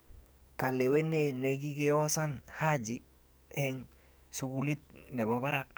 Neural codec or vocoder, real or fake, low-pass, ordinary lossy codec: codec, 44.1 kHz, 2.6 kbps, SNAC; fake; none; none